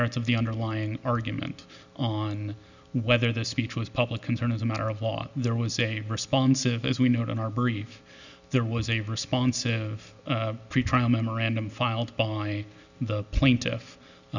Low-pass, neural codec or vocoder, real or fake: 7.2 kHz; none; real